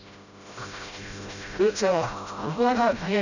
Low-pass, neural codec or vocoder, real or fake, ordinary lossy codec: 7.2 kHz; codec, 16 kHz, 0.5 kbps, FreqCodec, smaller model; fake; none